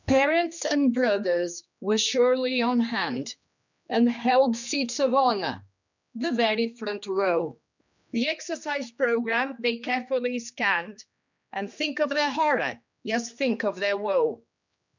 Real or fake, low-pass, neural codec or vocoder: fake; 7.2 kHz; codec, 16 kHz, 2 kbps, X-Codec, HuBERT features, trained on general audio